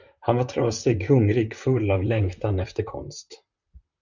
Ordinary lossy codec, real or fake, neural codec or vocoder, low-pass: Opus, 64 kbps; fake; vocoder, 44.1 kHz, 128 mel bands, Pupu-Vocoder; 7.2 kHz